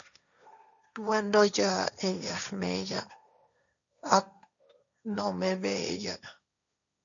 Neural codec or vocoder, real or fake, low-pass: codec, 16 kHz, 1.1 kbps, Voila-Tokenizer; fake; 7.2 kHz